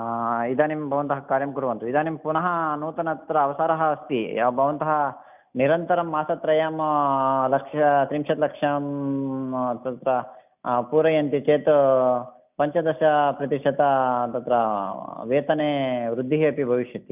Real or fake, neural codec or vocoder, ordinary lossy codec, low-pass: real; none; none; 3.6 kHz